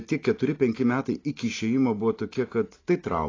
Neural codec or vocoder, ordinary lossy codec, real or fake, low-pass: none; AAC, 32 kbps; real; 7.2 kHz